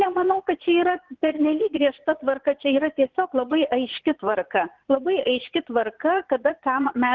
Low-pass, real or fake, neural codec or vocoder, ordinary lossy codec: 7.2 kHz; fake; vocoder, 22.05 kHz, 80 mel bands, Vocos; Opus, 16 kbps